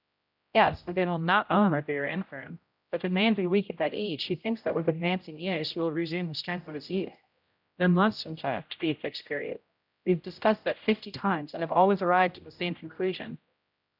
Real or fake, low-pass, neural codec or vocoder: fake; 5.4 kHz; codec, 16 kHz, 0.5 kbps, X-Codec, HuBERT features, trained on general audio